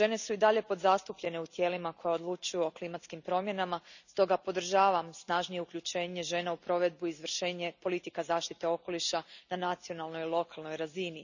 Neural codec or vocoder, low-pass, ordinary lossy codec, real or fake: none; 7.2 kHz; none; real